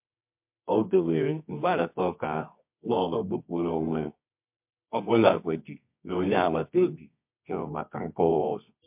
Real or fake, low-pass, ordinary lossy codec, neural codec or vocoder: fake; 3.6 kHz; MP3, 32 kbps; codec, 24 kHz, 0.9 kbps, WavTokenizer, medium music audio release